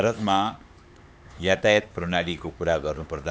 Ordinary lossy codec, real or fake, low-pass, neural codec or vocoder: none; fake; none; codec, 16 kHz, 4 kbps, X-Codec, HuBERT features, trained on LibriSpeech